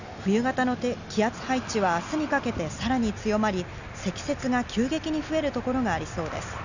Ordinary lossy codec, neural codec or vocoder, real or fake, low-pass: none; none; real; 7.2 kHz